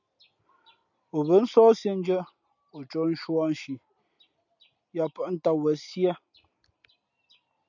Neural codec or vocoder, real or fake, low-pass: none; real; 7.2 kHz